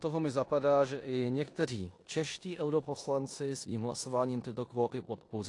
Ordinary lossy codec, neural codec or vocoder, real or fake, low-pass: AAC, 48 kbps; codec, 16 kHz in and 24 kHz out, 0.9 kbps, LongCat-Audio-Codec, four codebook decoder; fake; 10.8 kHz